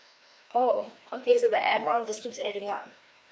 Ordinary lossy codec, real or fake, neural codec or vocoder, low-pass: none; fake; codec, 16 kHz, 1 kbps, FreqCodec, larger model; none